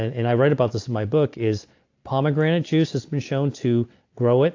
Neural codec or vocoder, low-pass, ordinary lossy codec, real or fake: none; 7.2 kHz; AAC, 48 kbps; real